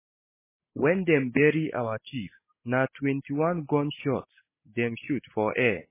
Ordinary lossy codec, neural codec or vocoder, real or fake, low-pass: MP3, 16 kbps; none; real; 3.6 kHz